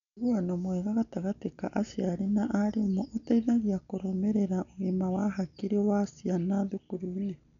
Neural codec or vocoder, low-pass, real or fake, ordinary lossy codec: none; 7.2 kHz; real; none